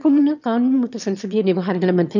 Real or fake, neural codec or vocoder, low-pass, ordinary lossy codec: fake; autoencoder, 22.05 kHz, a latent of 192 numbers a frame, VITS, trained on one speaker; 7.2 kHz; none